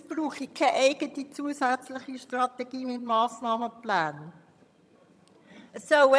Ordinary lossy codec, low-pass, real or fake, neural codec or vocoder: none; none; fake; vocoder, 22.05 kHz, 80 mel bands, HiFi-GAN